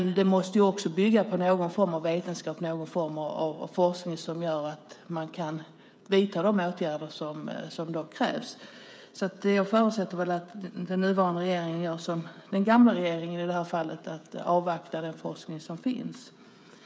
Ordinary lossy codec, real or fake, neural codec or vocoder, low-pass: none; fake; codec, 16 kHz, 16 kbps, FreqCodec, smaller model; none